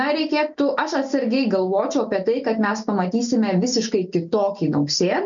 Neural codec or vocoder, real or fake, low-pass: none; real; 7.2 kHz